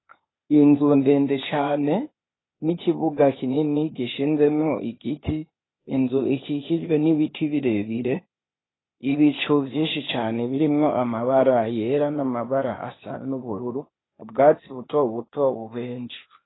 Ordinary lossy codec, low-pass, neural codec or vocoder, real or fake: AAC, 16 kbps; 7.2 kHz; codec, 16 kHz, 0.8 kbps, ZipCodec; fake